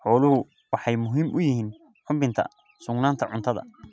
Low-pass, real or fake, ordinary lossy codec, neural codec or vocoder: none; real; none; none